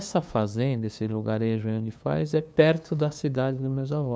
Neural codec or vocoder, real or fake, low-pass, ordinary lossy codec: codec, 16 kHz, 2 kbps, FunCodec, trained on LibriTTS, 25 frames a second; fake; none; none